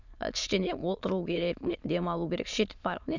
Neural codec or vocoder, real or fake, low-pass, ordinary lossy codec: autoencoder, 22.05 kHz, a latent of 192 numbers a frame, VITS, trained on many speakers; fake; 7.2 kHz; none